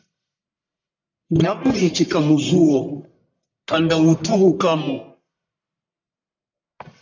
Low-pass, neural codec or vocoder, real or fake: 7.2 kHz; codec, 44.1 kHz, 1.7 kbps, Pupu-Codec; fake